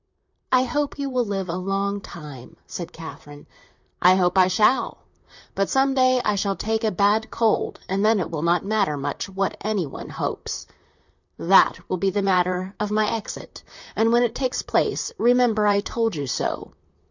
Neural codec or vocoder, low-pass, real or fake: vocoder, 44.1 kHz, 128 mel bands, Pupu-Vocoder; 7.2 kHz; fake